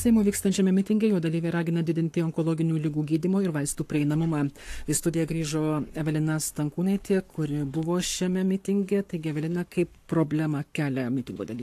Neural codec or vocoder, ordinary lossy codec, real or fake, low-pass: codec, 44.1 kHz, 7.8 kbps, Pupu-Codec; AAC, 64 kbps; fake; 14.4 kHz